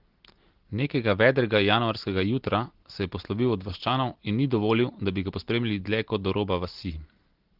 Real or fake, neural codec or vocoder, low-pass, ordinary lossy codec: real; none; 5.4 kHz; Opus, 16 kbps